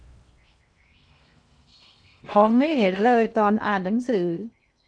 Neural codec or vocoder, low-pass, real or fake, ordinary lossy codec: codec, 16 kHz in and 24 kHz out, 0.6 kbps, FocalCodec, streaming, 2048 codes; 9.9 kHz; fake; none